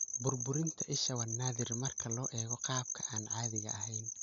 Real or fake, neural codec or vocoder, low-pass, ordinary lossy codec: real; none; 7.2 kHz; none